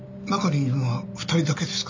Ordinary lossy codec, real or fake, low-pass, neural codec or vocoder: MP3, 64 kbps; real; 7.2 kHz; none